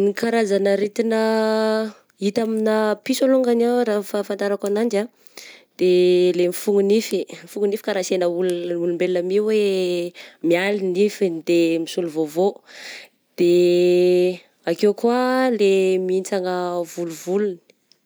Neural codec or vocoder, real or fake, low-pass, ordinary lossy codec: none; real; none; none